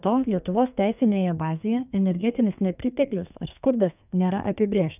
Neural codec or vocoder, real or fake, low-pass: codec, 32 kHz, 1.9 kbps, SNAC; fake; 3.6 kHz